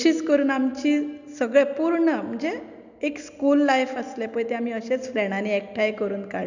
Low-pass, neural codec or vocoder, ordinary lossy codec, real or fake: 7.2 kHz; none; none; real